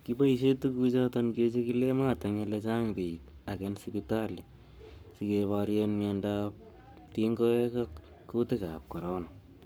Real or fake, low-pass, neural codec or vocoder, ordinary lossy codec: fake; none; codec, 44.1 kHz, 7.8 kbps, Pupu-Codec; none